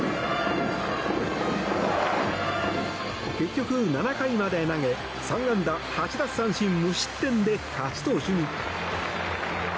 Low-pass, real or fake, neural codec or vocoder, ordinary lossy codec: none; real; none; none